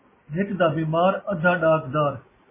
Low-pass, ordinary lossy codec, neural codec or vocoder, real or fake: 3.6 kHz; MP3, 16 kbps; none; real